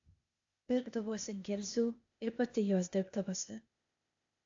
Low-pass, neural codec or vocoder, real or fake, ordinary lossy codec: 7.2 kHz; codec, 16 kHz, 0.8 kbps, ZipCodec; fake; AAC, 48 kbps